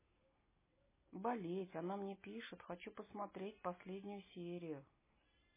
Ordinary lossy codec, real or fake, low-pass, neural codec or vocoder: MP3, 16 kbps; real; 3.6 kHz; none